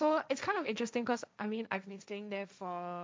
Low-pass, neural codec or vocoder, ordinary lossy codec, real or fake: none; codec, 16 kHz, 1.1 kbps, Voila-Tokenizer; none; fake